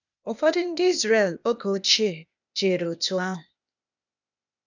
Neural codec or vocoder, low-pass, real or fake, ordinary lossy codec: codec, 16 kHz, 0.8 kbps, ZipCodec; 7.2 kHz; fake; none